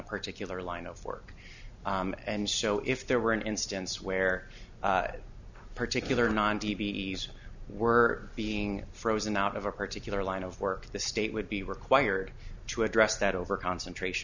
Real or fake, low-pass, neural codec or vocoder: real; 7.2 kHz; none